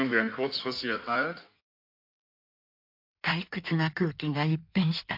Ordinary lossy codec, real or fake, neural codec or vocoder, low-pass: MP3, 48 kbps; fake; codec, 16 kHz in and 24 kHz out, 1.1 kbps, FireRedTTS-2 codec; 5.4 kHz